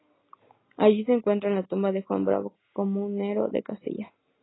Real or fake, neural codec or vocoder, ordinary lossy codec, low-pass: real; none; AAC, 16 kbps; 7.2 kHz